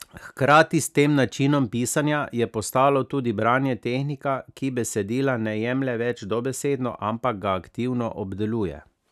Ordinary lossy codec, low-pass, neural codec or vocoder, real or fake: none; 14.4 kHz; none; real